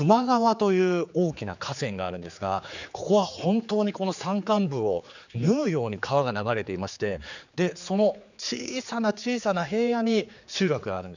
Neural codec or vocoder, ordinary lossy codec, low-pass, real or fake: codec, 16 kHz, 4 kbps, X-Codec, HuBERT features, trained on general audio; none; 7.2 kHz; fake